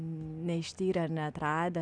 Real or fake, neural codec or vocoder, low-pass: real; none; 9.9 kHz